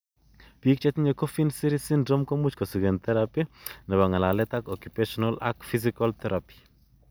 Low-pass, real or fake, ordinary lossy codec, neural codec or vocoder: none; real; none; none